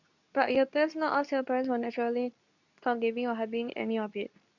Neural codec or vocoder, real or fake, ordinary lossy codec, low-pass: codec, 24 kHz, 0.9 kbps, WavTokenizer, medium speech release version 2; fake; none; 7.2 kHz